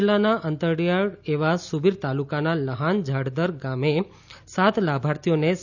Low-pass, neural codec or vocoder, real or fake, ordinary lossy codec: 7.2 kHz; none; real; none